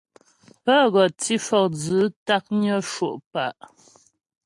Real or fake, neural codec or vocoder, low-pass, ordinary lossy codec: real; none; 10.8 kHz; AAC, 64 kbps